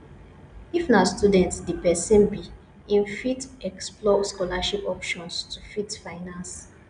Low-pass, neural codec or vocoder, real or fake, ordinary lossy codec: 9.9 kHz; none; real; none